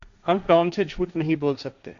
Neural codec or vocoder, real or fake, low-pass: codec, 16 kHz, 1.1 kbps, Voila-Tokenizer; fake; 7.2 kHz